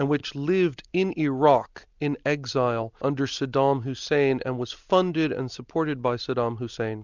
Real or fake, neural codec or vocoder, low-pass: real; none; 7.2 kHz